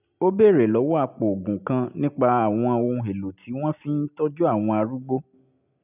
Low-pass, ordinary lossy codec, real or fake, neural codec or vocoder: 3.6 kHz; none; real; none